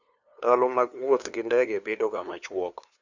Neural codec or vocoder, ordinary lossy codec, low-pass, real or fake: codec, 16 kHz, 2 kbps, FunCodec, trained on LibriTTS, 25 frames a second; Opus, 64 kbps; 7.2 kHz; fake